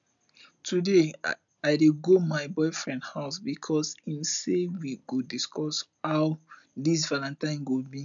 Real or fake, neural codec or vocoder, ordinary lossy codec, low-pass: real; none; none; 7.2 kHz